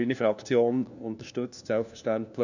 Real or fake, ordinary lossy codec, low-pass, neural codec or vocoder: fake; none; 7.2 kHz; codec, 16 kHz, 1 kbps, FunCodec, trained on LibriTTS, 50 frames a second